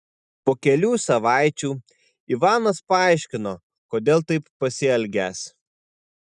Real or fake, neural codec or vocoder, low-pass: real; none; 10.8 kHz